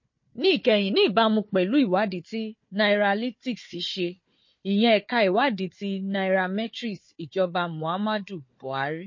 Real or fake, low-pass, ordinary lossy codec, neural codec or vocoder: fake; 7.2 kHz; MP3, 32 kbps; codec, 16 kHz, 4 kbps, FunCodec, trained on Chinese and English, 50 frames a second